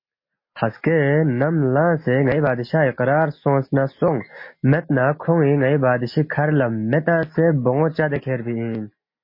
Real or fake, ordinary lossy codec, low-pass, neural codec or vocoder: real; MP3, 24 kbps; 5.4 kHz; none